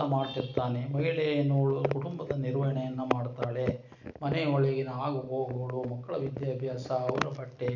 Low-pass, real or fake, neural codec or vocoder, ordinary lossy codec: 7.2 kHz; real; none; none